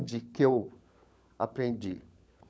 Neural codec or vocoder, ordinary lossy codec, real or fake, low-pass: codec, 16 kHz, 16 kbps, FunCodec, trained on LibriTTS, 50 frames a second; none; fake; none